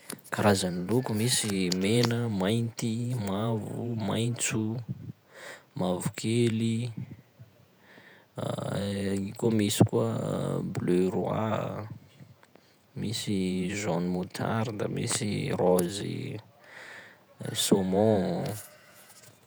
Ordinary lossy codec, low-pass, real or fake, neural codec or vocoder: none; none; fake; vocoder, 48 kHz, 128 mel bands, Vocos